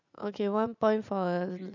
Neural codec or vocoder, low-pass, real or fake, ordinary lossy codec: vocoder, 44.1 kHz, 80 mel bands, Vocos; 7.2 kHz; fake; none